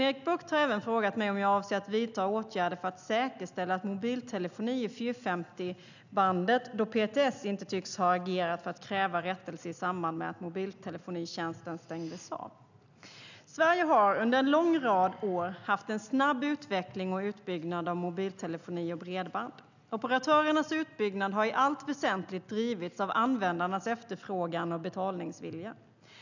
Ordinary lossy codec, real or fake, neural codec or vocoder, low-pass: none; real; none; 7.2 kHz